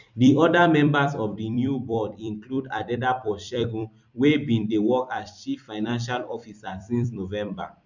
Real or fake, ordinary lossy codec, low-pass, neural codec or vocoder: fake; none; 7.2 kHz; vocoder, 44.1 kHz, 128 mel bands every 256 samples, BigVGAN v2